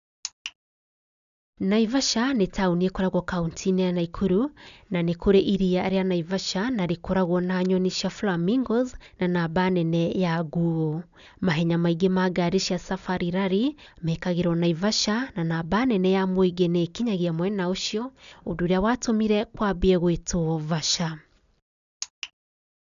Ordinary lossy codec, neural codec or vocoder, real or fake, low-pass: none; none; real; 7.2 kHz